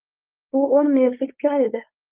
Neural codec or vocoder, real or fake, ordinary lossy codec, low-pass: codec, 16 kHz, 4.8 kbps, FACodec; fake; Opus, 32 kbps; 3.6 kHz